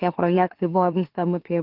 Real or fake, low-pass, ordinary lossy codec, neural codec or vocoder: fake; 5.4 kHz; Opus, 16 kbps; autoencoder, 44.1 kHz, a latent of 192 numbers a frame, MeloTTS